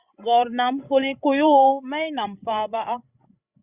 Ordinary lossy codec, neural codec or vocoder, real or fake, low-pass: Opus, 64 kbps; codec, 16 kHz, 16 kbps, FreqCodec, larger model; fake; 3.6 kHz